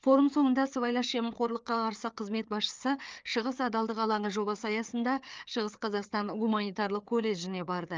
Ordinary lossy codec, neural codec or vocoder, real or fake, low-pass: Opus, 24 kbps; codec, 16 kHz, 4 kbps, FreqCodec, larger model; fake; 7.2 kHz